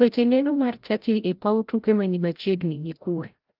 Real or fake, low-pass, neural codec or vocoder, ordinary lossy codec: fake; 5.4 kHz; codec, 16 kHz, 0.5 kbps, FreqCodec, larger model; Opus, 32 kbps